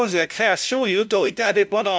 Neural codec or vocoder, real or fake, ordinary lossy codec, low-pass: codec, 16 kHz, 0.5 kbps, FunCodec, trained on LibriTTS, 25 frames a second; fake; none; none